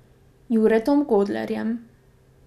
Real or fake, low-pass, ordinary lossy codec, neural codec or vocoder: real; 14.4 kHz; none; none